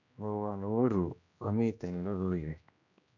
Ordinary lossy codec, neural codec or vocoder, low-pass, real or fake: none; codec, 16 kHz, 1 kbps, X-Codec, HuBERT features, trained on general audio; 7.2 kHz; fake